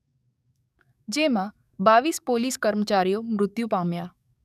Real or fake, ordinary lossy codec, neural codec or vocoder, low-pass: fake; none; codec, 44.1 kHz, 7.8 kbps, DAC; 14.4 kHz